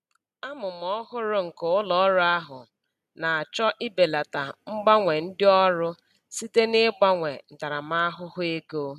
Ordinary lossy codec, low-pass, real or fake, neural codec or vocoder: none; 14.4 kHz; real; none